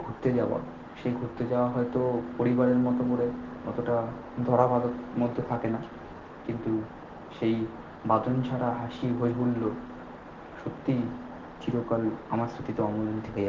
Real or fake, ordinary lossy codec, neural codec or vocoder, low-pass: real; Opus, 16 kbps; none; 7.2 kHz